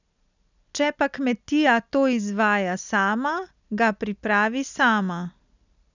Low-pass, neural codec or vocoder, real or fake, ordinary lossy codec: 7.2 kHz; none; real; none